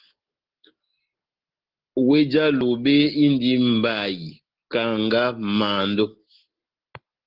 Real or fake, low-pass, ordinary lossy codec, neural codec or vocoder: real; 5.4 kHz; Opus, 16 kbps; none